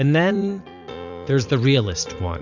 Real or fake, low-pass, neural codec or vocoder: fake; 7.2 kHz; vocoder, 44.1 kHz, 80 mel bands, Vocos